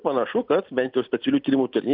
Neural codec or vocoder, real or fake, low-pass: none; real; 5.4 kHz